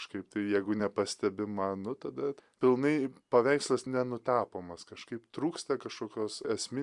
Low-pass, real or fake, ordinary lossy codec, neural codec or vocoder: 10.8 kHz; fake; Opus, 64 kbps; vocoder, 44.1 kHz, 128 mel bands every 256 samples, BigVGAN v2